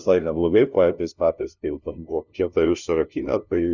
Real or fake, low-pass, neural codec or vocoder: fake; 7.2 kHz; codec, 16 kHz, 0.5 kbps, FunCodec, trained on LibriTTS, 25 frames a second